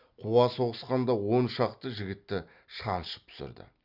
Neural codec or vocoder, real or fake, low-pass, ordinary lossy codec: none; real; 5.4 kHz; Opus, 64 kbps